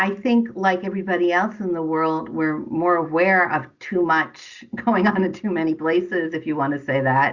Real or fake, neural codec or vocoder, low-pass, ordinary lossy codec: real; none; 7.2 kHz; Opus, 64 kbps